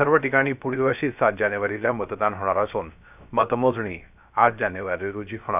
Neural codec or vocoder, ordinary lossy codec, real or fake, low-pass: codec, 16 kHz, 0.3 kbps, FocalCodec; none; fake; 3.6 kHz